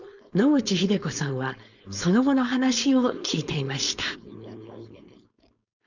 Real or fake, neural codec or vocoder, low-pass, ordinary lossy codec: fake; codec, 16 kHz, 4.8 kbps, FACodec; 7.2 kHz; none